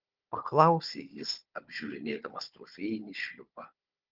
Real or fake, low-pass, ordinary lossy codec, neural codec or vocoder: fake; 5.4 kHz; Opus, 32 kbps; codec, 16 kHz, 4 kbps, FunCodec, trained on Chinese and English, 50 frames a second